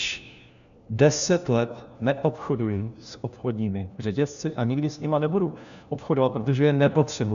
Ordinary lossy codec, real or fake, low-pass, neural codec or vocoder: AAC, 96 kbps; fake; 7.2 kHz; codec, 16 kHz, 1 kbps, FunCodec, trained on LibriTTS, 50 frames a second